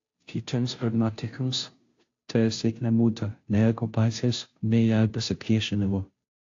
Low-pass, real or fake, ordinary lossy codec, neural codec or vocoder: 7.2 kHz; fake; AAC, 48 kbps; codec, 16 kHz, 0.5 kbps, FunCodec, trained on Chinese and English, 25 frames a second